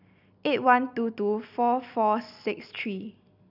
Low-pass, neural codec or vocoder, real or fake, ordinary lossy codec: 5.4 kHz; none; real; none